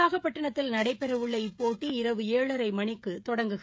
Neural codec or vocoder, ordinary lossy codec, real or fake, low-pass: codec, 16 kHz, 16 kbps, FreqCodec, smaller model; none; fake; none